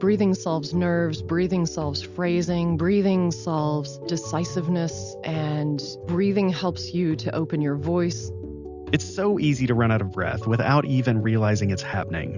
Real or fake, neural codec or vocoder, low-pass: real; none; 7.2 kHz